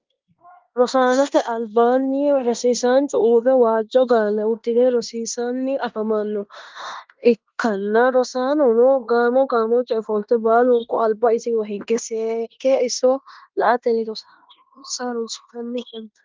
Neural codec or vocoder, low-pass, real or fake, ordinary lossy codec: codec, 16 kHz in and 24 kHz out, 0.9 kbps, LongCat-Audio-Codec, fine tuned four codebook decoder; 7.2 kHz; fake; Opus, 32 kbps